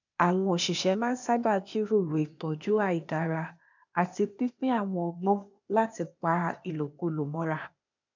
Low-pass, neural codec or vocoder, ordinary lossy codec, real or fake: 7.2 kHz; codec, 16 kHz, 0.8 kbps, ZipCodec; none; fake